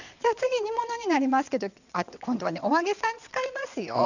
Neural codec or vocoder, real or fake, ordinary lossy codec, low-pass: vocoder, 22.05 kHz, 80 mel bands, WaveNeXt; fake; none; 7.2 kHz